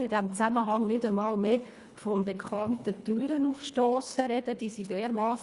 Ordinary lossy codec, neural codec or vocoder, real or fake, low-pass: Opus, 64 kbps; codec, 24 kHz, 1.5 kbps, HILCodec; fake; 10.8 kHz